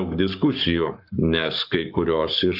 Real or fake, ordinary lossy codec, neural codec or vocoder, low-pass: fake; AAC, 48 kbps; codec, 44.1 kHz, 7.8 kbps, Pupu-Codec; 5.4 kHz